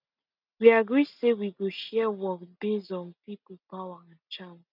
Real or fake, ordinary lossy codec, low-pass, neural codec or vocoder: real; none; 5.4 kHz; none